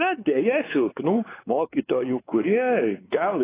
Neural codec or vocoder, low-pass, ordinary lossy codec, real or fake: codec, 16 kHz, 4 kbps, X-Codec, WavLM features, trained on Multilingual LibriSpeech; 3.6 kHz; AAC, 16 kbps; fake